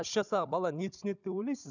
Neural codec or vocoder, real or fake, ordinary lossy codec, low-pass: codec, 16 kHz, 16 kbps, FunCodec, trained on Chinese and English, 50 frames a second; fake; none; 7.2 kHz